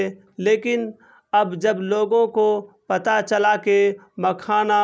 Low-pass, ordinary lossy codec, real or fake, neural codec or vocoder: none; none; real; none